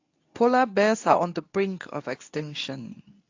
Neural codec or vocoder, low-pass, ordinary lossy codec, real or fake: codec, 24 kHz, 0.9 kbps, WavTokenizer, medium speech release version 2; 7.2 kHz; AAC, 48 kbps; fake